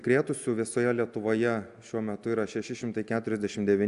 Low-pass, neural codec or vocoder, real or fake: 10.8 kHz; none; real